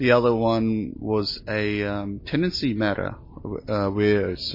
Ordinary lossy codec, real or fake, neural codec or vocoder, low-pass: MP3, 24 kbps; real; none; 5.4 kHz